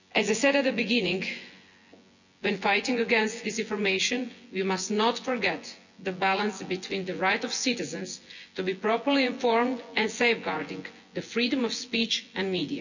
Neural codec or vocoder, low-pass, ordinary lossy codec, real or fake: vocoder, 24 kHz, 100 mel bands, Vocos; 7.2 kHz; none; fake